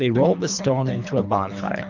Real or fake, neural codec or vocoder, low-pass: fake; codec, 24 kHz, 3 kbps, HILCodec; 7.2 kHz